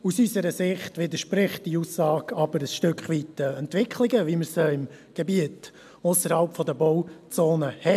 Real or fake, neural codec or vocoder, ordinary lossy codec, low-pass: real; none; MP3, 96 kbps; 14.4 kHz